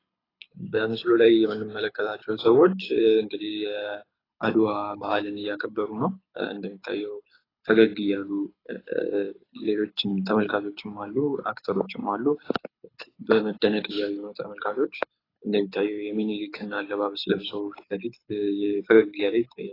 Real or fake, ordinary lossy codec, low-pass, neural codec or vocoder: fake; AAC, 24 kbps; 5.4 kHz; codec, 24 kHz, 6 kbps, HILCodec